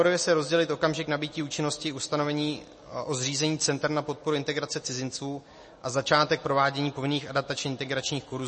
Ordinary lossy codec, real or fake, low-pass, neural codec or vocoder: MP3, 32 kbps; real; 10.8 kHz; none